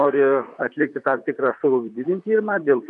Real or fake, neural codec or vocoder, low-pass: fake; vocoder, 44.1 kHz, 128 mel bands, Pupu-Vocoder; 10.8 kHz